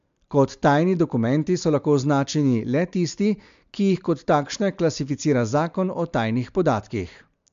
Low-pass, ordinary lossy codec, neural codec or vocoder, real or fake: 7.2 kHz; MP3, 64 kbps; none; real